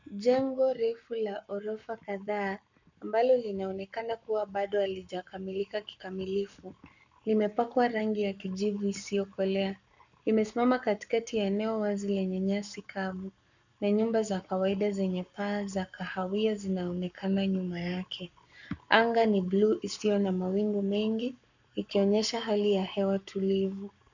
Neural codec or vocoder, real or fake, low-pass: codec, 44.1 kHz, 7.8 kbps, Pupu-Codec; fake; 7.2 kHz